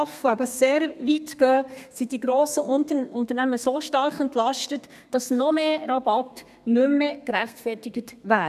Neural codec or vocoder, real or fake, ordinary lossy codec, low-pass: codec, 32 kHz, 1.9 kbps, SNAC; fake; none; 14.4 kHz